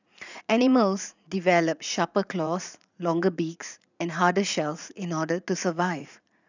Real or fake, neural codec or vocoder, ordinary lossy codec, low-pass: fake; vocoder, 44.1 kHz, 80 mel bands, Vocos; none; 7.2 kHz